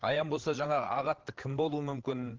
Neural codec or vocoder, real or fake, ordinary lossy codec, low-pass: codec, 16 kHz, 8 kbps, FreqCodec, larger model; fake; Opus, 16 kbps; 7.2 kHz